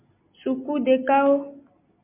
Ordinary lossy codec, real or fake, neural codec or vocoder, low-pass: MP3, 32 kbps; real; none; 3.6 kHz